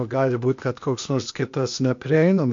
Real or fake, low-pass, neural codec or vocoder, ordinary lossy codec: fake; 7.2 kHz; codec, 16 kHz, 0.8 kbps, ZipCodec; MP3, 48 kbps